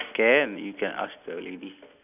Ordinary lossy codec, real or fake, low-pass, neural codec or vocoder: none; real; 3.6 kHz; none